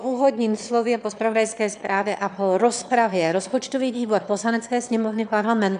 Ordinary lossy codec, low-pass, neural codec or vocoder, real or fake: MP3, 96 kbps; 9.9 kHz; autoencoder, 22.05 kHz, a latent of 192 numbers a frame, VITS, trained on one speaker; fake